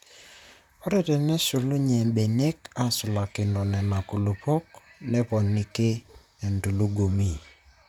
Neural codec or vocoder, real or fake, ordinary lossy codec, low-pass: vocoder, 44.1 kHz, 128 mel bands, Pupu-Vocoder; fake; none; 19.8 kHz